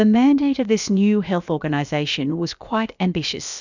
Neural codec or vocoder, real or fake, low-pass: codec, 16 kHz, about 1 kbps, DyCAST, with the encoder's durations; fake; 7.2 kHz